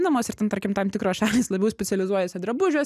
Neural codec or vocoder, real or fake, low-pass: none; real; 14.4 kHz